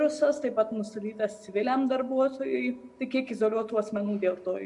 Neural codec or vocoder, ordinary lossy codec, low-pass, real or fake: none; MP3, 64 kbps; 10.8 kHz; real